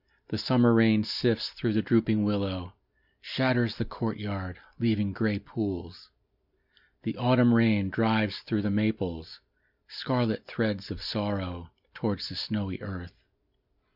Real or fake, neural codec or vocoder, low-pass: real; none; 5.4 kHz